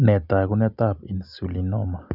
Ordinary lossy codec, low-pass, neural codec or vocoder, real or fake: none; 5.4 kHz; none; real